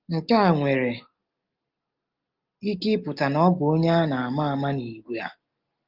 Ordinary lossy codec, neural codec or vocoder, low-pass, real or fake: Opus, 32 kbps; none; 5.4 kHz; real